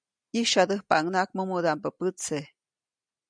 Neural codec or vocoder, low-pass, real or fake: none; 9.9 kHz; real